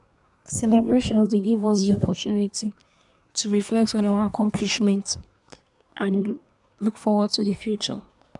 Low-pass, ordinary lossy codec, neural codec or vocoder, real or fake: 10.8 kHz; none; codec, 24 kHz, 1 kbps, SNAC; fake